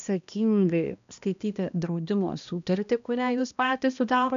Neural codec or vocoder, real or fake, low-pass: codec, 16 kHz, 1 kbps, X-Codec, HuBERT features, trained on balanced general audio; fake; 7.2 kHz